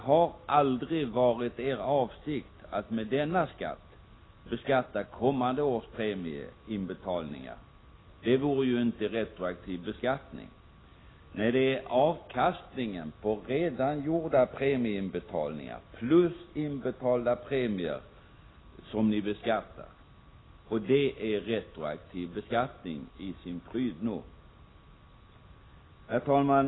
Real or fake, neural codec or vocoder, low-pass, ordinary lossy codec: real; none; 7.2 kHz; AAC, 16 kbps